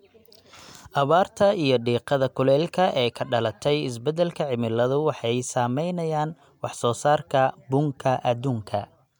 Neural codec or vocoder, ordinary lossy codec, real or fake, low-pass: none; MP3, 96 kbps; real; 19.8 kHz